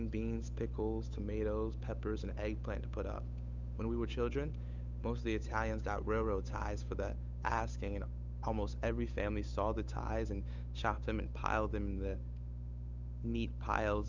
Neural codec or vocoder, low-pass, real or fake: codec, 16 kHz, 8 kbps, FunCodec, trained on Chinese and English, 25 frames a second; 7.2 kHz; fake